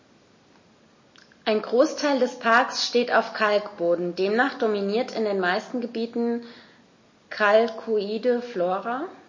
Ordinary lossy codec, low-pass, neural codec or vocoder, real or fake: MP3, 32 kbps; 7.2 kHz; none; real